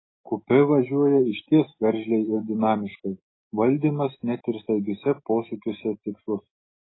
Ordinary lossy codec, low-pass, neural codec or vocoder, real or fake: AAC, 16 kbps; 7.2 kHz; none; real